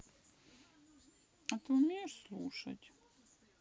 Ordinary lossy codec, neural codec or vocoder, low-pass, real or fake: none; none; none; real